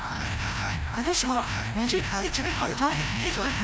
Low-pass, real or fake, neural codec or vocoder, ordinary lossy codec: none; fake; codec, 16 kHz, 0.5 kbps, FreqCodec, larger model; none